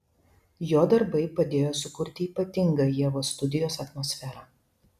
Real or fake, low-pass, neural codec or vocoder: real; 14.4 kHz; none